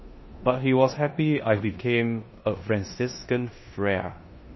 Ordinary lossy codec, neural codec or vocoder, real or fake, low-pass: MP3, 24 kbps; codec, 16 kHz in and 24 kHz out, 0.9 kbps, LongCat-Audio-Codec, four codebook decoder; fake; 7.2 kHz